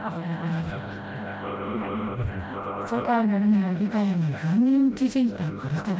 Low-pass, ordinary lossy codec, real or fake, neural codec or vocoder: none; none; fake; codec, 16 kHz, 0.5 kbps, FreqCodec, smaller model